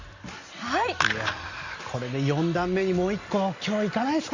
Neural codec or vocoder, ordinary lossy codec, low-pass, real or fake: none; Opus, 64 kbps; 7.2 kHz; real